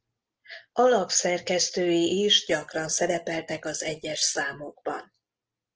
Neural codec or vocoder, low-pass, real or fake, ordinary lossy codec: none; 7.2 kHz; real; Opus, 16 kbps